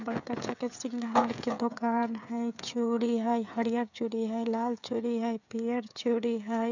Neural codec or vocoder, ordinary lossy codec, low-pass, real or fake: codec, 16 kHz, 16 kbps, FreqCodec, smaller model; none; 7.2 kHz; fake